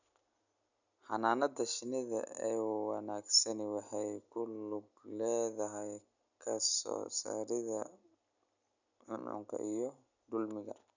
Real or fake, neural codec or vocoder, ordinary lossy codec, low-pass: real; none; none; 7.2 kHz